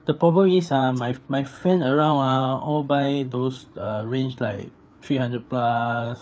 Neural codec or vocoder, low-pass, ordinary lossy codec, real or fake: codec, 16 kHz, 4 kbps, FreqCodec, larger model; none; none; fake